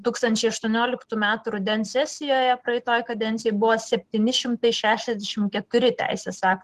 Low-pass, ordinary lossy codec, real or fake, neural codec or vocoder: 14.4 kHz; Opus, 16 kbps; real; none